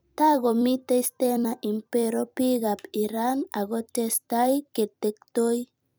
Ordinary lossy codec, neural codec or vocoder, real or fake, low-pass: none; vocoder, 44.1 kHz, 128 mel bands every 256 samples, BigVGAN v2; fake; none